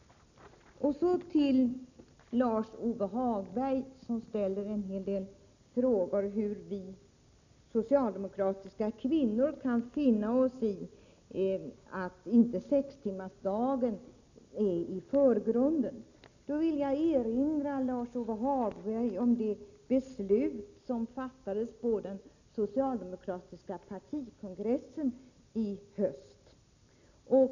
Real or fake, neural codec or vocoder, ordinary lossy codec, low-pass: real; none; none; 7.2 kHz